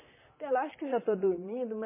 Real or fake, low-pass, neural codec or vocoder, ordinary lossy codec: fake; 3.6 kHz; vocoder, 44.1 kHz, 128 mel bands, Pupu-Vocoder; MP3, 16 kbps